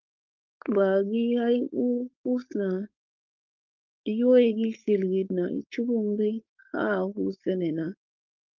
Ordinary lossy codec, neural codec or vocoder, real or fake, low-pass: Opus, 32 kbps; codec, 16 kHz, 4.8 kbps, FACodec; fake; 7.2 kHz